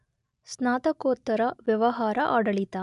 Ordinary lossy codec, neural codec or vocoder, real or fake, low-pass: none; none; real; 10.8 kHz